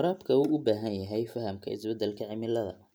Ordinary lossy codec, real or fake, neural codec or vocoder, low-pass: none; real; none; none